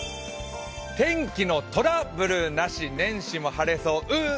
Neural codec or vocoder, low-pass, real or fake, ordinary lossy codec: none; none; real; none